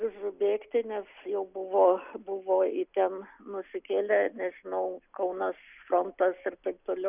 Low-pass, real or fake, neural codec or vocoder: 3.6 kHz; real; none